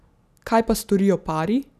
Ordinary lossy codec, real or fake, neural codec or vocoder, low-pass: none; real; none; 14.4 kHz